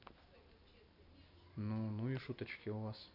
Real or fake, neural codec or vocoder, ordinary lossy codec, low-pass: real; none; none; 5.4 kHz